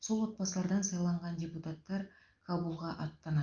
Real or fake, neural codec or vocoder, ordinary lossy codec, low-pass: real; none; Opus, 32 kbps; 7.2 kHz